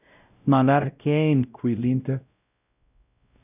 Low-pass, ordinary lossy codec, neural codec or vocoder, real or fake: 3.6 kHz; none; codec, 16 kHz, 0.5 kbps, X-Codec, WavLM features, trained on Multilingual LibriSpeech; fake